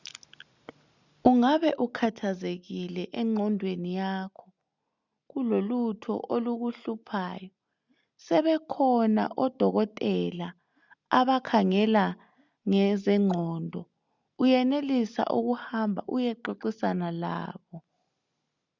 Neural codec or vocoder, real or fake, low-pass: none; real; 7.2 kHz